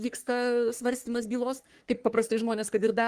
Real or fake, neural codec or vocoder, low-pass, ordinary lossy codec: fake; codec, 44.1 kHz, 3.4 kbps, Pupu-Codec; 14.4 kHz; Opus, 24 kbps